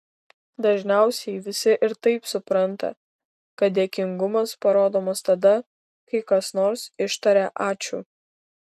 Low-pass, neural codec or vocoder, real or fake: 14.4 kHz; none; real